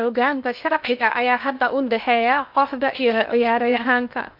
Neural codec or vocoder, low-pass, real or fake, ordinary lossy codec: codec, 16 kHz in and 24 kHz out, 0.6 kbps, FocalCodec, streaming, 2048 codes; 5.4 kHz; fake; MP3, 48 kbps